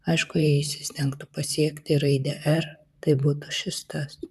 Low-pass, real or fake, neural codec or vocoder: 14.4 kHz; fake; vocoder, 44.1 kHz, 128 mel bands, Pupu-Vocoder